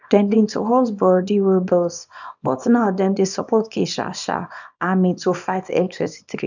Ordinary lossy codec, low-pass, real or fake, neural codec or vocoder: none; 7.2 kHz; fake; codec, 24 kHz, 0.9 kbps, WavTokenizer, small release